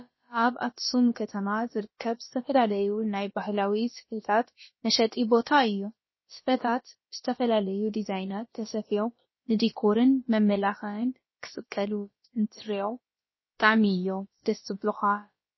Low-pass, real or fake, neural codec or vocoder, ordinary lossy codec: 7.2 kHz; fake; codec, 16 kHz, about 1 kbps, DyCAST, with the encoder's durations; MP3, 24 kbps